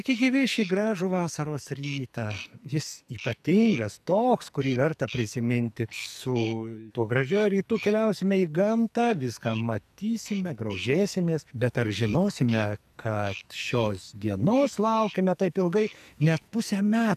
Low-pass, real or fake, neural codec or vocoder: 14.4 kHz; fake; codec, 44.1 kHz, 2.6 kbps, SNAC